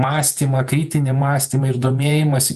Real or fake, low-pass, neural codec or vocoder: fake; 14.4 kHz; vocoder, 48 kHz, 128 mel bands, Vocos